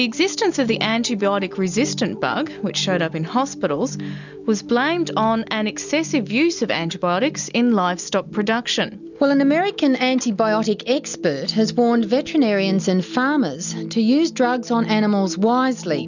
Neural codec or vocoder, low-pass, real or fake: none; 7.2 kHz; real